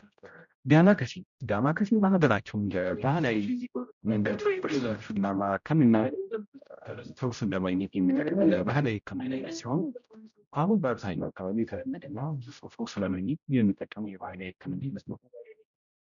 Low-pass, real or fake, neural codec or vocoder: 7.2 kHz; fake; codec, 16 kHz, 0.5 kbps, X-Codec, HuBERT features, trained on general audio